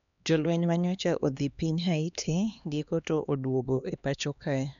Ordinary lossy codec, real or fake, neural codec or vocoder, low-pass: none; fake; codec, 16 kHz, 2 kbps, X-Codec, HuBERT features, trained on LibriSpeech; 7.2 kHz